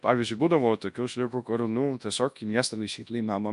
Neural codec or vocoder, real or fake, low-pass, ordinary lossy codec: codec, 24 kHz, 0.9 kbps, WavTokenizer, large speech release; fake; 10.8 kHz; MP3, 64 kbps